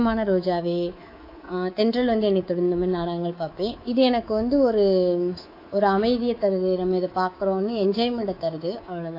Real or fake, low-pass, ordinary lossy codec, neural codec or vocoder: fake; 5.4 kHz; AAC, 32 kbps; codec, 24 kHz, 3.1 kbps, DualCodec